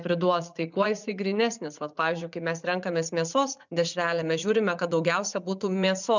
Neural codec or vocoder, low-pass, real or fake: vocoder, 44.1 kHz, 80 mel bands, Vocos; 7.2 kHz; fake